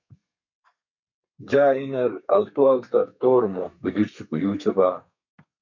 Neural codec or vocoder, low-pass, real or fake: codec, 32 kHz, 1.9 kbps, SNAC; 7.2 kHz; fake